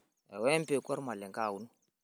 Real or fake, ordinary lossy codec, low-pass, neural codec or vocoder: real; none; none; none